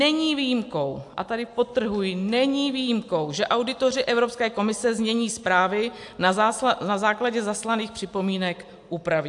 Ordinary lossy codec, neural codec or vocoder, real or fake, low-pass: AAC, 64 kbps; none; real; 10.8 kHz